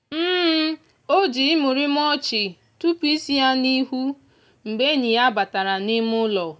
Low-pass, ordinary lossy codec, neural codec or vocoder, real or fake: none; none; none; real